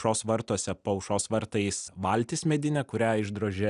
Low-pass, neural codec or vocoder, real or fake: 10.8 kHz; none; real